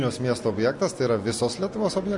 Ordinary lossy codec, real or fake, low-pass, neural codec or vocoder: MP3, 48 kbps; real; 10.8 kHz; none